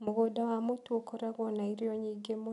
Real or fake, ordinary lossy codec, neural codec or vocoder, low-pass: real; none; none; 10.8 kHz